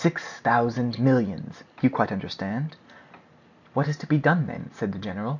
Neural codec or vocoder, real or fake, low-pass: none; real; 7.2 kHz